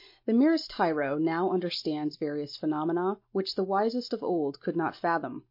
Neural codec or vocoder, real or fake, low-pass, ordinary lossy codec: none; real; 5.4 kHz; MP3, 32 kbps